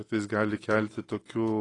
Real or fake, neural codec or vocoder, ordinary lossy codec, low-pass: real; none; AAC, 32 kbps; 10.8 kHz